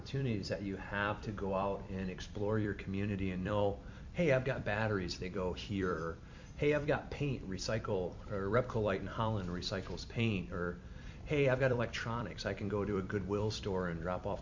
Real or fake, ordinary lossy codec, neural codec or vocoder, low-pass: fake; MP3, 48 kbps; vocoder, 44.1 kHz, 128 mel bands every 256 samples, BigVGAN v2; 7.2 kHz